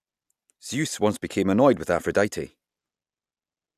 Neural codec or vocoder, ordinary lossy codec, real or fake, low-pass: none; none; real; 14.4 kHz